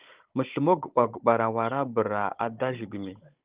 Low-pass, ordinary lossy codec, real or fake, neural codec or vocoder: 3.6 kHz; Opus, 32 kbps; fake; codec, 16 kHz, 16 kbps, FunCodec, trained on Chinese and English, 50 frames a second